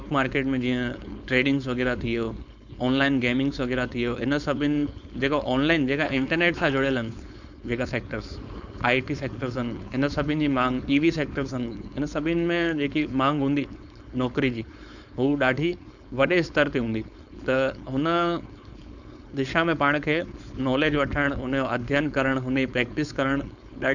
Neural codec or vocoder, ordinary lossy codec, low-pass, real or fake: codec, 16 kHz, 4.8 kbps, FACodec; none; 7.2 kHz; fake